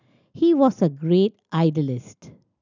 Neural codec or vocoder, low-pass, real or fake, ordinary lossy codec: none; 7.2 kHz; real; none